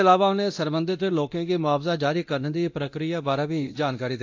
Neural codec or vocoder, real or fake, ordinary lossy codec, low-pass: codec, 24 kHz, 0.9 kbps, DualCodec; fake; AAC, 48 kbps; 7.2 kHz